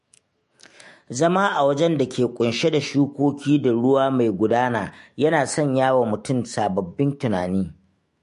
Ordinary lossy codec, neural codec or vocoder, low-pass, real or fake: MP3, 48 kbps; autoencoder, 48 kHz, 128 numbers a frame, DAC-VAE, trained on Japanese speech; 14.4 kHz; fake